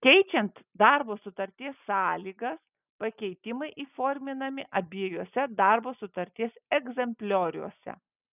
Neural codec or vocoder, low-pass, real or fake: none; 3.6 kHz; real